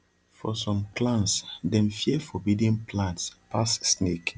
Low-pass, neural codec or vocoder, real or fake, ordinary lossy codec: none; none; real; none